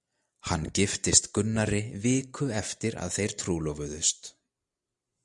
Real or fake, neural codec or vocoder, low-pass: real; none; 10.8 kHz